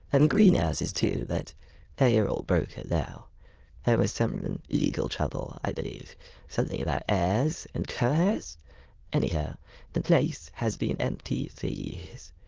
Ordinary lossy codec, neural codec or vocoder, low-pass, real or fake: Opus, 16 kbps; autoencoder, 22.05 kHz, a latent of 192 numbers a frame, VITS, trained on many speakers; 7.2 kHz; fake